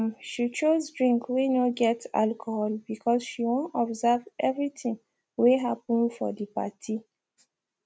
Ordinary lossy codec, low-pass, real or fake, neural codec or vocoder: none; none; real; none